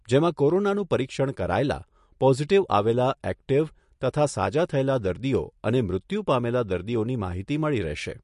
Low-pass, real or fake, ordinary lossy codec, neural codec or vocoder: 14.4 kHz; real; MP3, 48 kbps; none